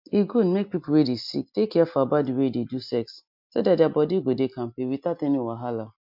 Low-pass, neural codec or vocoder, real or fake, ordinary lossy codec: 5.4 kHz; none; real; MP3, 48 kbps